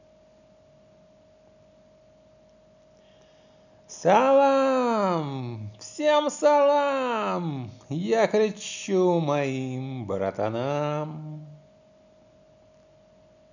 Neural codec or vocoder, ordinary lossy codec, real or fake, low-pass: none; none; real; 7.2 kHz